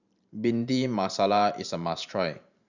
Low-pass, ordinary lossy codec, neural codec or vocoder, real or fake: 7.2 kHz; none; vocoder, 44.1 kHz, 128 mel bands every 512 samples, BigVGAN v2; fake